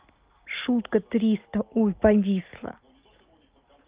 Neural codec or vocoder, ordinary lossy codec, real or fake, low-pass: none; Opus, 32 kbps; real; 3.6 kHz